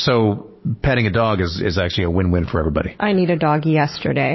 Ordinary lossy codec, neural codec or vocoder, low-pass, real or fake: MP3, 24 kbps; autoencoder, 48 kHz, 128 numbers a frame, DAC-VAE, trained on Japanese speech; 7.2 kHz; fake